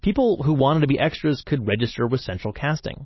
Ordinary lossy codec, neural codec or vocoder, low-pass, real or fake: MP3, 24 kbps; none; 7.2 kHz; real